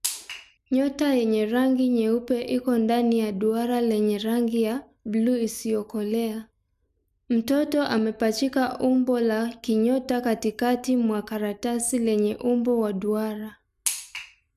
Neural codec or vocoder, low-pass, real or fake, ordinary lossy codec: none; 14.4 kHz; real; none